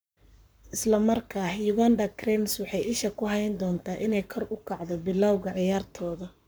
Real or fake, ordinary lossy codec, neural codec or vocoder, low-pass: fake; none; codec, 44.1 kHz, 7.8 kbps, Pupu-Codec; none